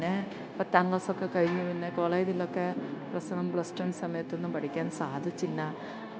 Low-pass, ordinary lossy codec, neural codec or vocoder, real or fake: none; none; codec, 16 kHz, 0.9 kbps, LongCat-Audio-Codec; fake